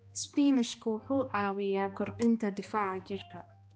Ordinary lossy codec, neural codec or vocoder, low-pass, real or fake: none; codec, 16 kHz, 1 kbps, X-Codec, HuBERT features, trained on balanced general audio; none; fake